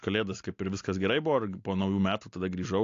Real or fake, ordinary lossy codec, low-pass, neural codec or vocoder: real; AAC, 48 kbps; 7.2 kHz; none